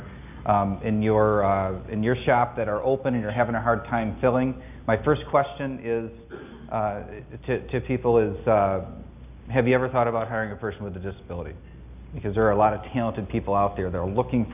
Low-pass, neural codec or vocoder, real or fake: 3.6 kHz; none; real